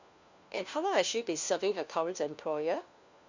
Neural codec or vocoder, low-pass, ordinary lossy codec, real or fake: codec, 16 kHz, 1 kbps, FunCodec, trained on LibriTTS, 50 frames a second; 7.2 kHz; Opus, 64 kbps; fake